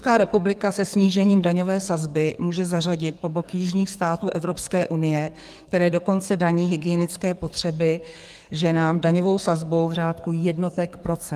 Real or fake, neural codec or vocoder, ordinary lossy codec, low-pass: fake; codec, 44.1 kHz, 2.6 kbps, SNAC; Opus, 32 kbps; 14.4 kHz